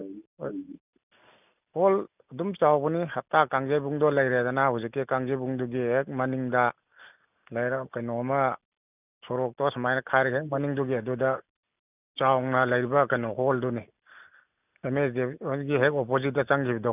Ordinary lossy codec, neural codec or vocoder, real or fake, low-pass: none; none; real; 3.6 kHz